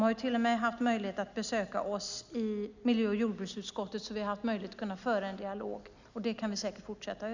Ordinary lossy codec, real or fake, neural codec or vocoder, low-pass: none; real; none; 7.2 kHz